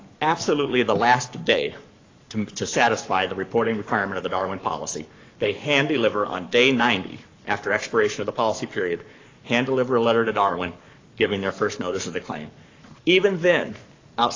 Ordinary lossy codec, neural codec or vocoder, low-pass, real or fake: AAC, 32 kbps; codec, 44.1 kHz, 7.8 kbps, Pupu-Codec; 7.2 kHz; fake